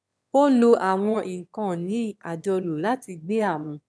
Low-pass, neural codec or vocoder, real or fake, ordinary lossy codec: none; autoencoder, 22.05 kHz, a latent of 192 numbers a frame, VITS, trained on one speaker; fake; none